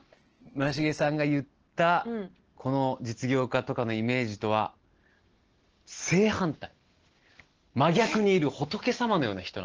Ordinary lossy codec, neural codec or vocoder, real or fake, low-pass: Opus, 16 kbps; none; real; 7.2 kHz